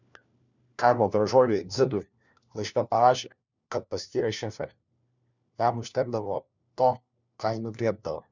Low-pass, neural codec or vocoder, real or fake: 7.2 kHz; codec, 16 kHz, 1 kbps, FunCodec, trained on LibriTTS, 50 frames a second; fake